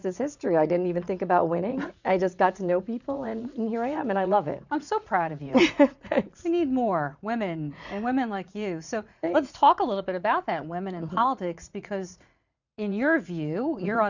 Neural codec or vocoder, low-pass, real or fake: vocoder, 44.1 kHz, 80 mel bands, Vocos; 7.2 kHz; fake